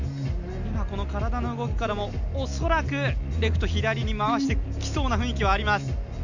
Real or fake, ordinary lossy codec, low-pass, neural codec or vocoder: real; none; 7.2 kHz; none